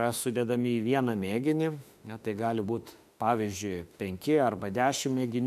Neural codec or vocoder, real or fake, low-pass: autoencoder, 48 kHz, 32 numbers a frame, DAC-VAE, trained on Japanese speech; fake; 14.4 kHz